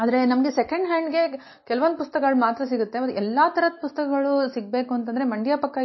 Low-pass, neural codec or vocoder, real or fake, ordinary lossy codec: 7.2 kHz; none; real; MP3, 24 kbps